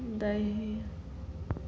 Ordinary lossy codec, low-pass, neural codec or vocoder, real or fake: none; none; none; real